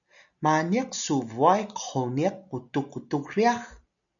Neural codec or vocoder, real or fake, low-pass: none; real; 7.2 kHz